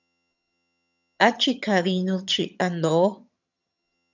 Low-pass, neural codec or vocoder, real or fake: 7.2 kHz; vocoder, 22.05 kHz, 80 mel bands, HiFi-GAN; fake